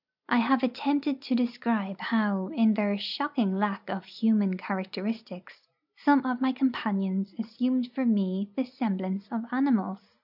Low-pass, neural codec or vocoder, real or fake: 5.4 kHz; none; real